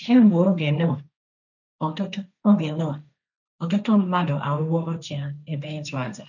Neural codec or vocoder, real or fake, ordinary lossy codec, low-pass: codec, 16 kHz, 1.1 kbps, Voila-Tokenizer; fake; none; 7.2 kHz